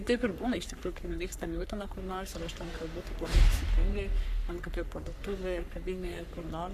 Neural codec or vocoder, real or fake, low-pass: codec, 44.1 kHz, 3.4 kbps, Pupu-Codec; fake; 14.4 kHz